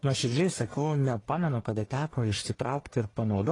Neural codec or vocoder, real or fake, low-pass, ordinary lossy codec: codec, 44.1 kHz, 1.7 kbps, Pupu-Codec; fake; 10.8 kHz; AAC, 32 kbps